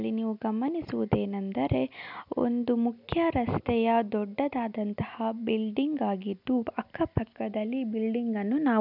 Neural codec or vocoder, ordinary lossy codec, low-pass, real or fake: none; none; 5.4 kHz; real